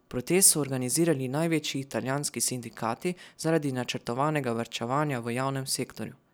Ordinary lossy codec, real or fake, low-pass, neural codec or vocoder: none; real; none; none